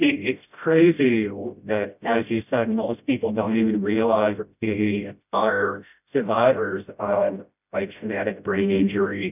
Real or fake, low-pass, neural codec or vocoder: fake; 3.6 kHz; codec, 16 kHz, 0.5 kbps, FreqCodec, smaller model